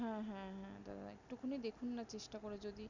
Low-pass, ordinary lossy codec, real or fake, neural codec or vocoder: 7.2 kHz; none; real; none